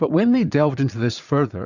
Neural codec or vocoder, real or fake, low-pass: vocoder, 44.1 kHz, 80 mel bands, Vocos; fake; 7.2 kHz